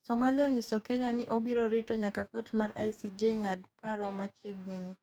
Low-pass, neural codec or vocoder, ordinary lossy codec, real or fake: none; codec, 44.1 kHz, 2.6 kbps, DAC; none; fake